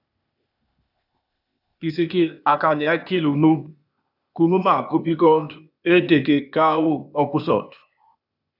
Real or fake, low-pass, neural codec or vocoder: fake; 5.4 kHz; codec, 16 kHz, 0.8 kbps, ZipCodec